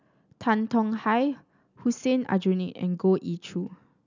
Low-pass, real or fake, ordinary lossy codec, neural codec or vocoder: 7.2 kHz; real; none; none